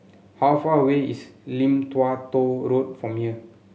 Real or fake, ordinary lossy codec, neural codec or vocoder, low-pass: real; none; none; none